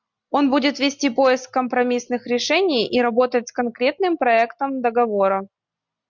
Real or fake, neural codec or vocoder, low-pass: real; none; 7.2 kHz